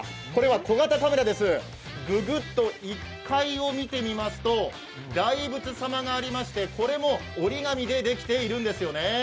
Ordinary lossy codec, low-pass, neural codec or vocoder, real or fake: none; none; none; real